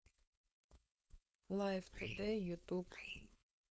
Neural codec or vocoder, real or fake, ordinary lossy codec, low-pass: codec, 16 kHz, 4.8 kbps, FACodec; fake; none; none